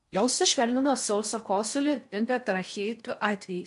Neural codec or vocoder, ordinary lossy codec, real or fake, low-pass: codec, 16 kHz in and 24 kHz out, 0.6 kbps, FocalCodec, streaming, 4096 codes; MP3, 64 kbps; fake; 10.8 kHz